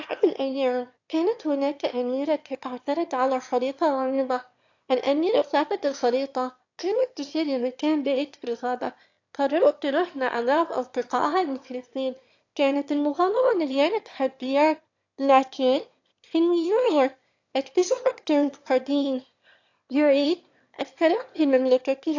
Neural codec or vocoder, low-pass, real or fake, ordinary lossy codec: autoencoder, 22.05 kHz, a latent of 192 numbers a frame, VITS, trained on one speaker; 7.2 kHz; fake; AAC, 48 kbps